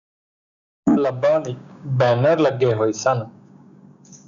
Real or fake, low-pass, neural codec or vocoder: fake; 7.2 kHz; codec, 16 kHz, 6 kbps, DAC